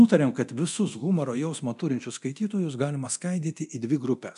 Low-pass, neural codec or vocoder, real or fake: 10.8 kHz; codec, 24 kHz, 0.9 kbps, DualCodec; fake